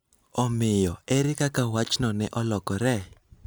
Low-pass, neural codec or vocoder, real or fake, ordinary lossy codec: none; none; real; none